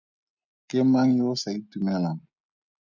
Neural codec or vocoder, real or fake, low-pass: none; real; 7.2 kHz